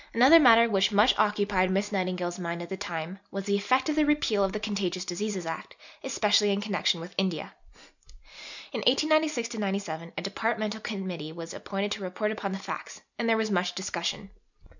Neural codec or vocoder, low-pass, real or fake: none; 7.2 kHz; real